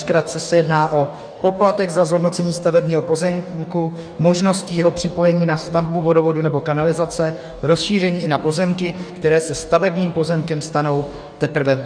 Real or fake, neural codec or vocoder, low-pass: fake; codec, 44.1 kHz, 2.6 kbps, DAC; 9.9 kHz